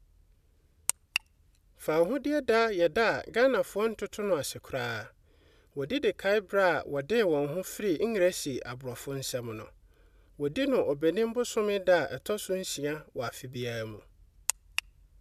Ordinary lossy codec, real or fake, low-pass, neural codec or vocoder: none; real; 14.4 kHz; none